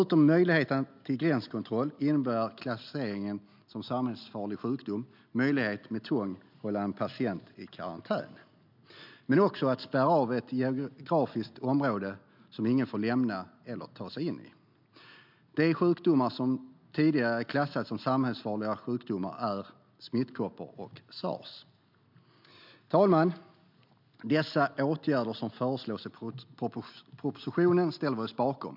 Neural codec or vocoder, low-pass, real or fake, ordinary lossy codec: none; 5.4 kHz; real; MP3, 48 kbps